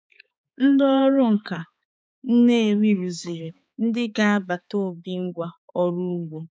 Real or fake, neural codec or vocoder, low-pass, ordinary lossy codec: fake; codec, 16 kHz, 4 kbps, X-Codec, HuBERT features, trained on balanced general audio; none; none